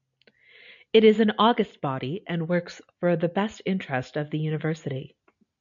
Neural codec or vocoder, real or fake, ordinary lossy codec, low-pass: none; real; MP3, 64 kbps; 7.2 kHz